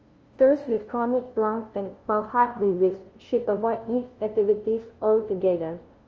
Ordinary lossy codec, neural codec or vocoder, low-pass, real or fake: Opus, 24 kbps; codec, 16 kHz, 0.5 kbps, FunCodec, trained on LibriTTS, 25 frames a second; 7.2 kHz; fake